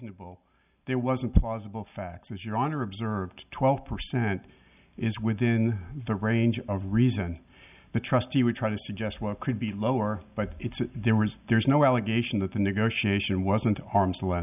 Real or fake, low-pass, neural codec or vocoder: real; 3.6 kHz; none